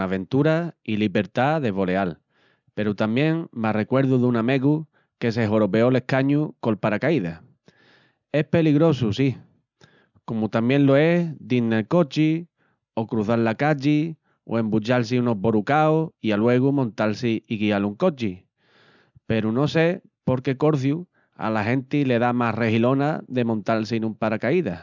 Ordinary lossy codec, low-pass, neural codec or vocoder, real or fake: none; 7.2 kHz; none; real